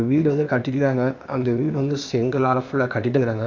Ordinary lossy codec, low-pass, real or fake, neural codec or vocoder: none; 7.2 kHz; fake; codec, 16 kHz, 0.8 kbps, ZipCodec